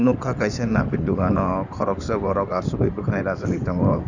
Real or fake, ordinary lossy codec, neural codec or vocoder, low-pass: fake; none; codec, 16 kHz in and 24 kHz out, 2.2 kbps, FireRedTTS-2 codec; 7.2 kHz